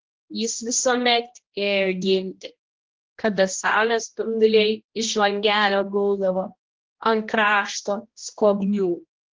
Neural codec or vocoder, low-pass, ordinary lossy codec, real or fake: codec, 16 kHz, 1 kbps, X-Codec, HuBERT features, trained on general audio; 7.2 kHz; Opus, 16 kbps; fake